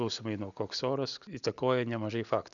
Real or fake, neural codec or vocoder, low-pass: real; none; 7.2 kHz